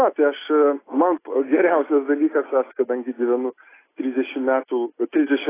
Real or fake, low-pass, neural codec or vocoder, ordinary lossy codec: real; 3.6 kHz; none; AAC, 16 kbps